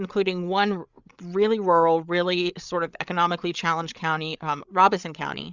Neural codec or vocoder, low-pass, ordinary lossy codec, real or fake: codec, 16 kHz, 8 kbps, FreqCodec, larger model; 7.2 kHz; Opus, 64 kbps; fake